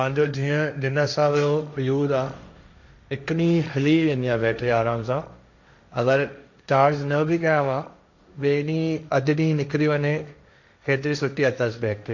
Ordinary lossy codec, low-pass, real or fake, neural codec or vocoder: none; 7.2 kHz; fake; codec, 16 kHz, 1.1 kbps, Voila-Tokenizer